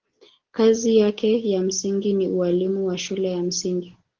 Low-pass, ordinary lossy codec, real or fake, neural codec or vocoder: 7.2 kHz; Opus, 16 kbps; real; none